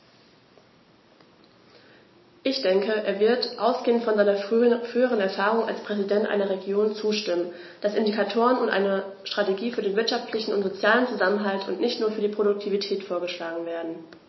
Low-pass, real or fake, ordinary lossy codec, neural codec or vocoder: 7.2 kHz; real; MP3, 24 kbps; none